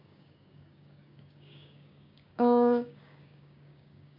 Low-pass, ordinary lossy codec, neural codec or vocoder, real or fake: 5.4 kHz; AAC, 32 kbps; codec, 16 kHz, 6 kbps, DAC; fake